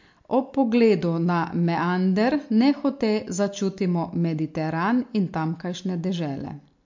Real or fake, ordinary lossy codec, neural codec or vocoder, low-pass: real; MP3, 48 kbps; none; 7.2 kHz